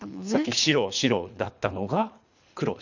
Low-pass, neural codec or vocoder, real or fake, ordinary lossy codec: 7.2 kHz; codec, 24 kHz, 3 kbps, HILCodec; fake; none